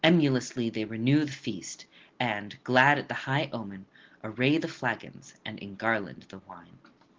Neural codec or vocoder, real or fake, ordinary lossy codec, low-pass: none; real; Opus, 16 kbps; 7.2 kHz